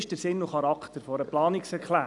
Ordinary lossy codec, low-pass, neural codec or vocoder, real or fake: none; 10.8 kHz; none; real